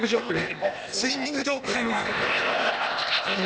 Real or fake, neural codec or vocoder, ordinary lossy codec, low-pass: fake; codec, 16 kHz, 0.8 kbps, ZipCodec; none; none